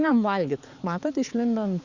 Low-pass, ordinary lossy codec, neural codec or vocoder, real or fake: 7.2 kHz; Opus, 64 kbps; codec, 16 kHz, 4 kbps, X-Codec, HuBERT features, trained on balanced general audio; fake